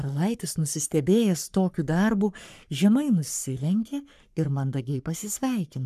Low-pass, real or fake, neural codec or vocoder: 14.4 kHz; fake; codec, 44.1 kHz, 3.4 kbps, Pupu-Codec